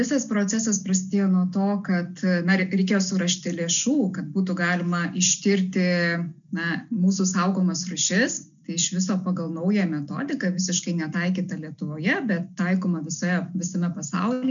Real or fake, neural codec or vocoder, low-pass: real; none; 7.2 kHz